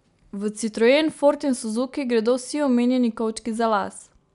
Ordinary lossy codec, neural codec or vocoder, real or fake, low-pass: none; none; real; 10.8 kHz